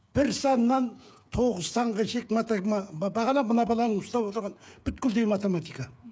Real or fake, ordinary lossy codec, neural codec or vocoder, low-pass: fake; none; codec, 16 kHz, 8 kbps, FreqCodec, smaller model; none